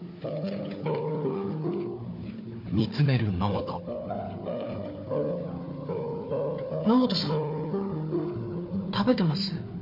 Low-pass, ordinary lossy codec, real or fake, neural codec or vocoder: 5.4 kHz; MP3, 32 kbps; fake; codec, 16 kHz, 4 kbps, FunCodec, trained on Chinese and English, 50 frames a second